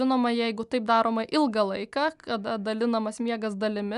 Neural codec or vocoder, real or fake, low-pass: none; real; 10.8 kHz